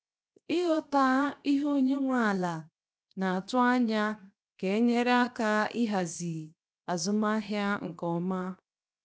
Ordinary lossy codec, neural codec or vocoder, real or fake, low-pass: none; codec, 16 kHz, 0.7 kbps, FocalCodec; fake; none